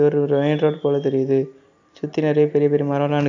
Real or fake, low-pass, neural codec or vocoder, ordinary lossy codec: real; 7.2 kHz; none; AAC, 48 kbps